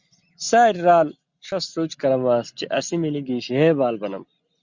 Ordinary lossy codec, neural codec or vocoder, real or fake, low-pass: Opus, 64 kbps; none; real; 7.2 kHz